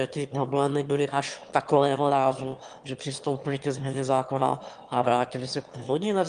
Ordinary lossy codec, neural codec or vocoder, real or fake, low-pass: Opus, 32 kbps; autoencoder, 22.05 kHz, a latent of 192 numbers a frame, VITS, trained on one speaker; fake; 9.9 kHz